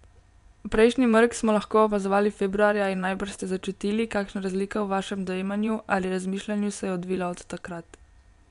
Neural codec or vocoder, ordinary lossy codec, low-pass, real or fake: vocoder, 24 kHz, 100 mel bands, Vocos; none; 10.8 kHz; fake